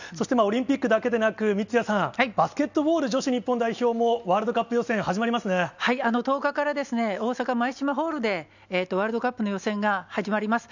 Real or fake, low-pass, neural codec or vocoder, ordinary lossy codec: real; 7.2 kHz; none; none